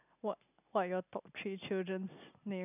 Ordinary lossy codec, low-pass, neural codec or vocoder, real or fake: none; 3.6 kHz; none; real